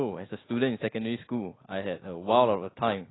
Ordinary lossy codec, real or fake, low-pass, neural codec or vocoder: AAC, 16 kbps; real; 7.2 kHz; none